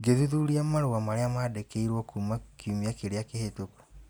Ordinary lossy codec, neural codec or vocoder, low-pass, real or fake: none; none; none; real